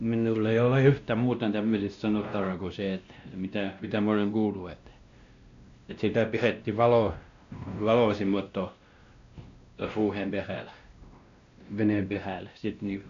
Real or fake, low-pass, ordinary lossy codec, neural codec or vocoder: fake; 7.2 kHz; MP3, 64 kbps; codec, 16 kHz, 1 kbps, X-Codec, WavLM features, trained on Multilingual LibriSpeech